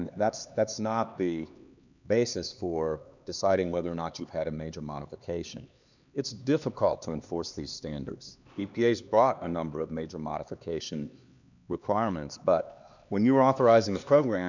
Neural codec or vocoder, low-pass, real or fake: codec, 16 kHz, 2 kbps, X-Codec, HuBERT features, trained on LibriSpeech; 7.2 kHz; fake